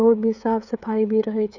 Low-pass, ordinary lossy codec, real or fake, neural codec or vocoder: 7.2 kHz; none; fake; codec, 16 kHz, 16 kbps, FreqCodec, smaller model